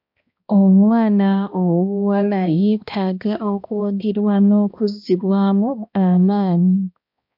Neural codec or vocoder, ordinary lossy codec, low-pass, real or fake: codec, 16 kHz, 1 kbps, X-Codec, HuBERT features, trained on balanced general audio; MP3, 48 kbps; 5.4 kHz; fake